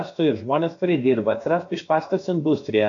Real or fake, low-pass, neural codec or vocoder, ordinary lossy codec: fake; 7.2 kHz; codec, 16 kHz, about 1 kbps, DyCAST, with the encoder's durations; AAC, 48 kbps